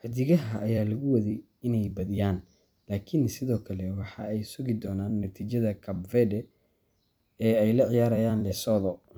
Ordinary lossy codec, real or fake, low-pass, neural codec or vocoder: none; fake; none; vocoder, 44.1 kHz, 128 mel bands every 256 samples, BigVGAN v2